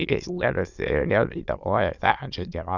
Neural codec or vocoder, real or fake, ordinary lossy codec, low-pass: autoencoder, 22.05 kHz, a latent of 192 numbers a frame, VITS, trained on many speakers; fake; Opus, 64 kbps; 7.2 kHz